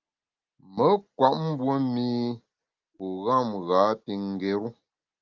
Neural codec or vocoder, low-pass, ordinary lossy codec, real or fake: none; 7.2 kHz; Opus, 24 kbps; real